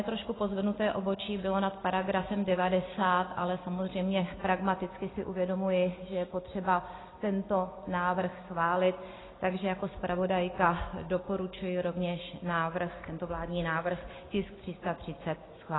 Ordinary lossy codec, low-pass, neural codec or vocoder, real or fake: AAC, 16 kbps; 7.2 kHz; none; real